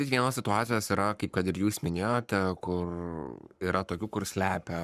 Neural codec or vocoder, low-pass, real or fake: codec, 44.1 kHz, 7.8 kbps, Pupu-Codec; 14.4 kHz; fake